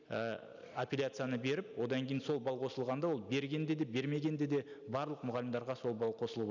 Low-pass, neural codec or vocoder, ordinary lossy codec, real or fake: 7.2 kHz; none; none; real